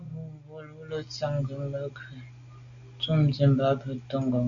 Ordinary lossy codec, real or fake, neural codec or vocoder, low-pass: AAC, 64 kbps; real; none; 7.2 kHz